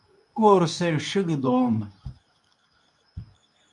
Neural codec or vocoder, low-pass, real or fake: codec, 24 kHz, 0.9 kbps, WavTokenizer, medium speech release version 2; 10.8 kHz; fake